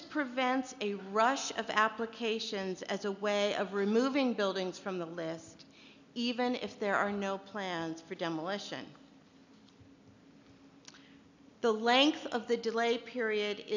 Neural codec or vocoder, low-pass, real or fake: none; 7.2 kHz; real